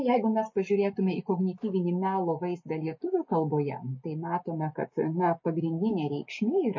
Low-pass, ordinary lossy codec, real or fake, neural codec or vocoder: 7.2 kHz; MP3, 32 kbps; real; none